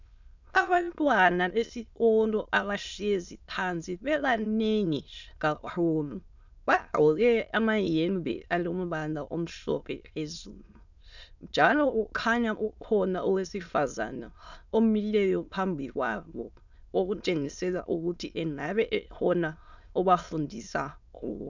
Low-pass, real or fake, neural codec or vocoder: 7.2 kHz; fake; autoencoder, 22.05 kHz, a latent of 192 numbers a frame, VITS, trained on many speakers